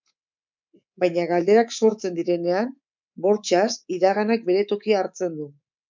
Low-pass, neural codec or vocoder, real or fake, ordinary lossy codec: 7.2 kHz; codec, 24 kHz, 3.1 kbps, DualCodec; fake; MP3, 64 kbps